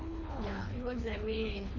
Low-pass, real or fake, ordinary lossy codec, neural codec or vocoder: 7.2 kHz; fake; none; codec, 24 kHz, 3 kbps, HILCodec